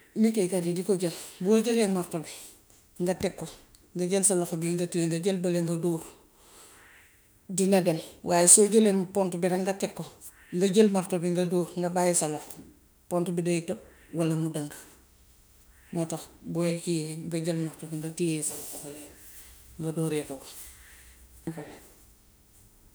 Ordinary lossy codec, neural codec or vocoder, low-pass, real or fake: none; autoencoder, 48 kHz, 32 numbers a frame, DAC-VAE, trained on Japanese speech; none; fake